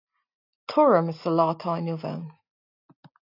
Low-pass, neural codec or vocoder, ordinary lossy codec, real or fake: 5.4 kHz; none; AAC, 32 kbps; real